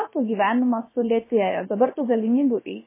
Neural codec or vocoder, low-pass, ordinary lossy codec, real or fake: codec, 16 kHz, about 1 kbps, DyCAST, with the encoder's durations; 3.6 kHz; MP3, 16 kbps; fake